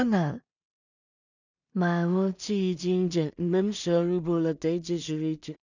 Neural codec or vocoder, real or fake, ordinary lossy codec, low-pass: codec, 16 kHz in and 24 kHz out, 0.4 kbps, LongCat-Audio-Codec, two codebook decoder; fake; none; 7.2 kHz